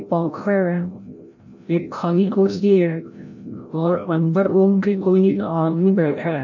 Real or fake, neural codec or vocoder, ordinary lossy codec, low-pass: fake; codec, 16 kHz, 0.5 kbps, FreqCodec, larger model; none; 7.2 kHz